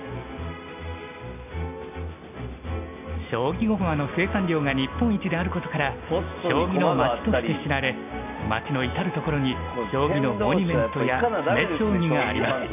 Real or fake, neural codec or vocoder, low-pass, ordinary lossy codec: real; none; 3.6 kHz; none